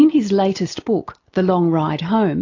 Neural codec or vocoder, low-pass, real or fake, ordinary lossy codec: none; 7.2 kHz; real; AAC, 48 kbps